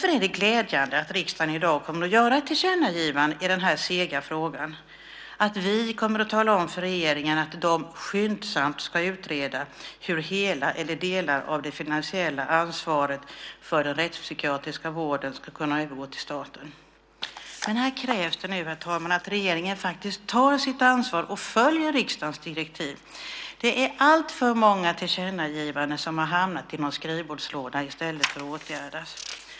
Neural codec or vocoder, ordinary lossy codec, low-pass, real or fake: none; none; none; real